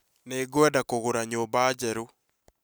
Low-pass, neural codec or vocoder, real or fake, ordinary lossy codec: none; none; real; none